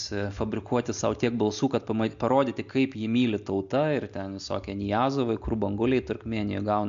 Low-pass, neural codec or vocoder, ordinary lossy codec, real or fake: 7.2 kHz; none; MP3, 64 kbps; real